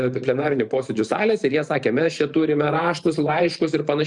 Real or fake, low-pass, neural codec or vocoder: fake; 10.8 kHz; vocoder, 44.1 kHz, 128 mel bands every 512 samples, BigVGAN v2